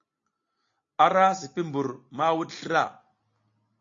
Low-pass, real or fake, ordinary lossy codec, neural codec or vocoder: 7.2 kHz; real; AAC, 48 kbps; none